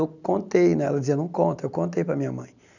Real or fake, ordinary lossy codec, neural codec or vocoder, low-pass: real; none; none; 7.2 kHz